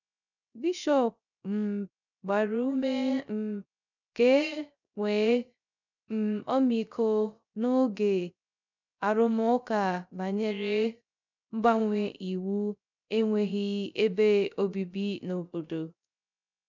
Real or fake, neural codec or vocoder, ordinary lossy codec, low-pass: fake; codec, 16 kHz, 0.3 kbps, FocalCodec; none; 7.2 kHz